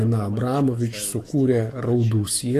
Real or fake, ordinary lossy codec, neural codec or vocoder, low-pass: fake; AAC, 48 kbps; autoencoder, 48 kHz, 128 numbers a frame, DAC-VAE, trained on Japanese speech; 14.4 kHz